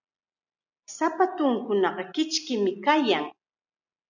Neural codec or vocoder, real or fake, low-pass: none; real; 7.2 kHz